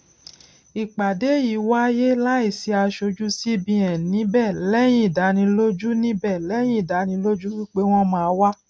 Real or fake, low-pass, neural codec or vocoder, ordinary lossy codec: real; none; none; none